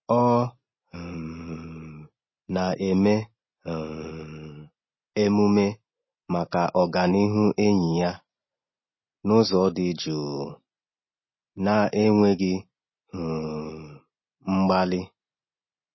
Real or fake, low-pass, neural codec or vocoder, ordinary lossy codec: real; 7.2 kHz; none; MP3, 24 kbps